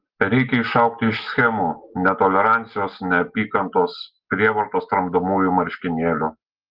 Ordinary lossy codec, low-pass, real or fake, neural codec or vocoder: Opus, 16 kbps; 5.4 kHz; real; none